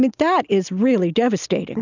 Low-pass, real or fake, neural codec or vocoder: 7.2 kHz; real; none